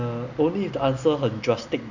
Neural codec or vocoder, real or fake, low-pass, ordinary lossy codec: none; real; 7.2 kHz; none